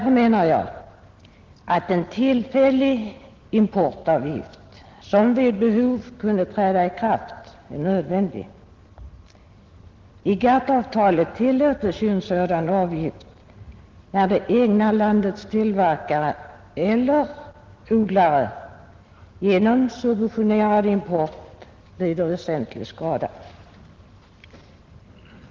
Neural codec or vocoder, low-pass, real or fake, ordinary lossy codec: none; 7.2 kHz; real; Opus, 16 kbps